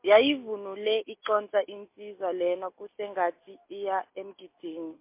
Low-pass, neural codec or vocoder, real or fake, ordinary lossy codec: 3.6 kHz; none; real; MP3, 24 kbps